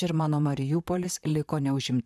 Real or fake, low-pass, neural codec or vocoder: fake; 14.4 kHz; vocoder, 44.1 kHz, 128 mel bands, Pupu-Vocoder